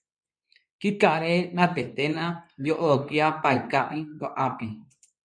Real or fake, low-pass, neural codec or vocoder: fake; 9.9 kHz; codec, 24 kHz, 0.9 kbps, WavTokenizer, medium speech release version 2